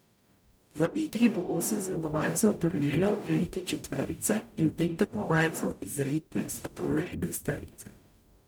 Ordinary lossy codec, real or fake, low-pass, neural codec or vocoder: none; fake; none; codec, 44.1 kHz, 0.9 kbps, DAC